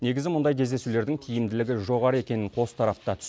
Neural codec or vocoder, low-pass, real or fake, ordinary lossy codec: none; none; real; none